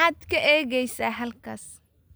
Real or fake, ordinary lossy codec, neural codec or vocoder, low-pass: real; none; none; none